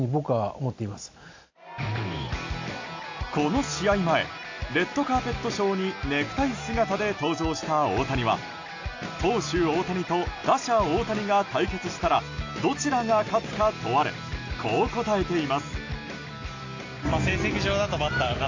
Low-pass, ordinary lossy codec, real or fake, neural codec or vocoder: 7.2 kHz; AAC, 48 kbps; real; none